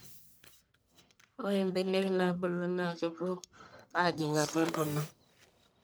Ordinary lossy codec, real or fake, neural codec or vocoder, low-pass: none; fake; codec, 44.1 kHz, 1.7 kbps, Pupu-Codec; none